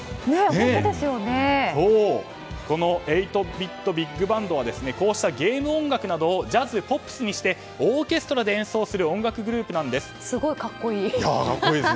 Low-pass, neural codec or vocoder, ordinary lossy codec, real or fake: none; none; none; real